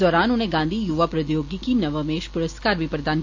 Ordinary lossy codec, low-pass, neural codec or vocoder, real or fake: AAC, 48 kbps; 7.2 kHz; none; real